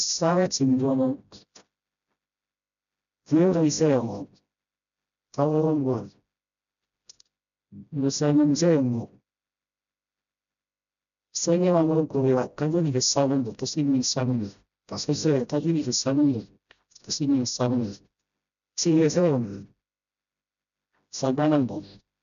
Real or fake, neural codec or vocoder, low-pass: fake; codec, 16 kHz, 0.5 kbps, FreqCodec, smaller model; 7.2 kHz